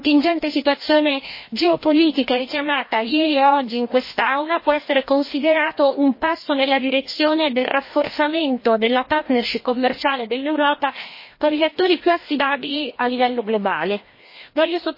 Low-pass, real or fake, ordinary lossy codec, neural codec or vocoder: 5.4 kHz; fake; MP3, 24 kbps; codec, 16 kHz, 1 kbps, FreqCodec, larger model